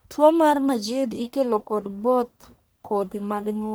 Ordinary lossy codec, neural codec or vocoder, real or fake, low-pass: none; codec, 44.1 kHz, 1.7 kbps, Pupu-Codec; fake; none